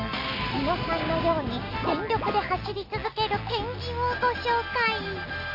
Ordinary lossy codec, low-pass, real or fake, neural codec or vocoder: MP3, 32 kbps; 5.4 kHz; real; none